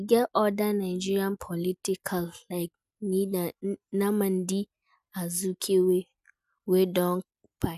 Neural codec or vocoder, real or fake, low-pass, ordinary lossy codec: none; real; 14.4 kHz; none